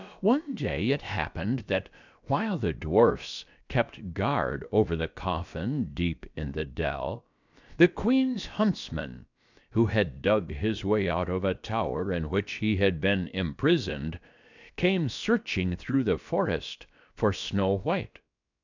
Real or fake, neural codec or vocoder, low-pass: fake; codec, 16 kHz, about 1 kbps, DyCAST, with the encoder's durations; 7.2 kHz